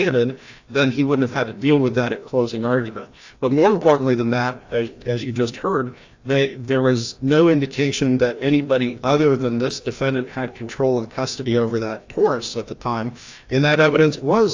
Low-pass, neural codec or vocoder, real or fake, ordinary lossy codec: 7.2 kHz; codec, 16 kHz, 1 kbps, FreqCodec, larger model; fake; AAC, 48 kbps